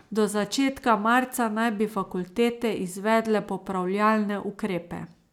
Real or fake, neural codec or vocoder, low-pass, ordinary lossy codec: real; none; 19.8 kHz; none